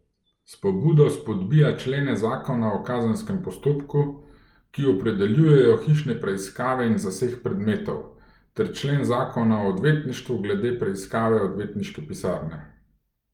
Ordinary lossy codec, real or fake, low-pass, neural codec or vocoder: Opus, 32 kbps; real; 19.8 kHz; none